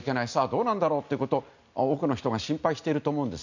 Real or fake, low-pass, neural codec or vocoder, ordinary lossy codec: real; 7.2 kHz; none; none